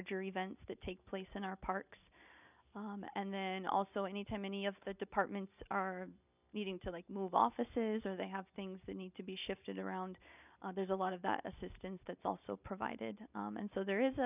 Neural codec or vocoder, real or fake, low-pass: none; real; 3.6 kHz